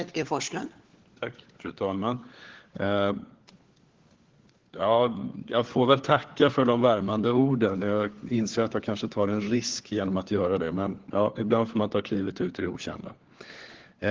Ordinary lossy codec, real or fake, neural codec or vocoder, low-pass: Opus, 16 kbps; fake; codec, 16 kHz, 4 kbps, FunCodec, trained on LibriTTS, 50 frames a second; 7.2 kHz